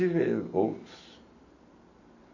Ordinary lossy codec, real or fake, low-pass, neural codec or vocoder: none; real; 7.2 kHz; none